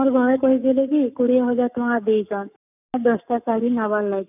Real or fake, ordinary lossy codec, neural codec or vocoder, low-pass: fake; none; codec, 44.1 kHz, 7.8 kbps, Pupu-Codec; 3.6 kHz